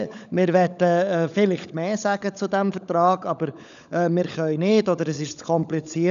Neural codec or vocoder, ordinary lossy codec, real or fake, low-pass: codec, 16 kHz, 16 kbps, FunCodec, trained on LibriTTS, 50 frames a second; none; fake; 7.2 kHz